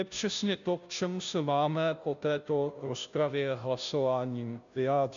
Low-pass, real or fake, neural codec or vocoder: 7.2 kHz; fake; codec, 16 kHz, 0.5 kbps, FunCodec, trained on Chinese and English, 25 frames a second